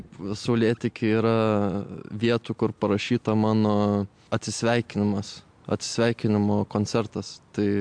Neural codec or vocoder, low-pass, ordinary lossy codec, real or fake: none; 9.9 kHz; MP3, 64 kbps; real